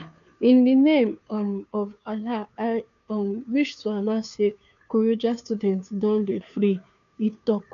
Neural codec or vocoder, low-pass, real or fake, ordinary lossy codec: codec, 16 kHz, 2 kbps, FunCodec, trained on Chinese and English, 25 frames a second; 7.2 kHz; fake; none